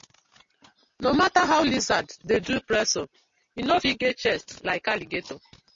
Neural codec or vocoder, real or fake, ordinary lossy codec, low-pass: none; real; MP3, 32 kbps; 7.2 kHz